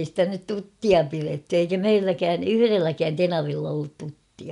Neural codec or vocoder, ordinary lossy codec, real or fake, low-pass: none; none; real; 10.8 kHz